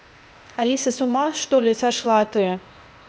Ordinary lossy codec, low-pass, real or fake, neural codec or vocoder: none; none; fake; codec, 16 kHz, 0.8 kbps, ZipCodec